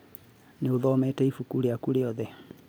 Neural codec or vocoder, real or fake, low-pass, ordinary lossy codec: none; real; none; none